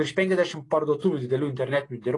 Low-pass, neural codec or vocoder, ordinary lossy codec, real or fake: 10.8 kHz; none; AAC, 32 kbps; real